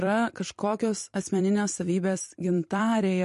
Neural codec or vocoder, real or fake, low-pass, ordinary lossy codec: vocoder, 44.1 kHz, 128 mel bands every 256 samples, BigVGAN v2; fake; 14.4 kHz; MP3, 48 kbps